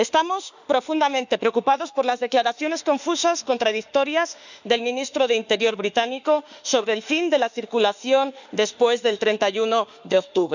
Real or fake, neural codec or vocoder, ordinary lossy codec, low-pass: fake; autoencoder, 48 kHz, 32 numbers a frame, DAC-VAE, trained on Japanese speech; none; 7.2 kHz